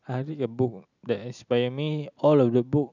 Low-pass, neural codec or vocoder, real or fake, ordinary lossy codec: 7.2 kHz; none; real; none